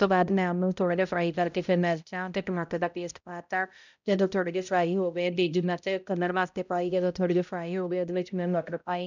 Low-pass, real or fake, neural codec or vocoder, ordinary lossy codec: 7.2 kHz; fake; codec, 16 kHz, 0.5 kbps, X-Codec, HuBERT features, trained on balanced general audio; none